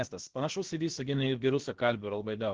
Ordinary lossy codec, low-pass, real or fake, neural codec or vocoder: Opus, 16 kbps; 7.2 kHz; fake; codec, 16 kHz, about 1 kbps, DyCAST, with the encoder's durations